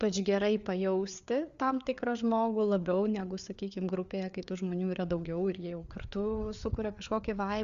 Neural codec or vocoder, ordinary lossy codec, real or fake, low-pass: codec, 16 kHz, 4 kbps, FreqCodec, larger model; Opus, 64 kbps; fake; 7.2 kHz